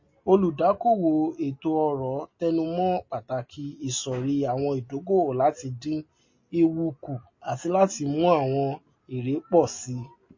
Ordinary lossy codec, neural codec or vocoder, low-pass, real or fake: MP3, 32 kbps; none; 7.2 kHz; real